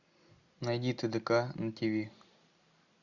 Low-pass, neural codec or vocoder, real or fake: 7.2 kHz; none; real